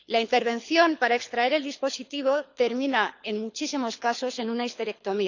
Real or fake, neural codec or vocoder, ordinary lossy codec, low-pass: fake; codec, 24 kHz, 6 kbps, HILCodec; none; 7.2 kHz